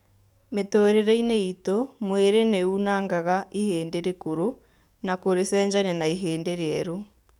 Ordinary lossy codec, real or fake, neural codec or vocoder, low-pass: none; fake; codec, 44.1 kHz, 7.8 kbps, DAC; 19.8 kHz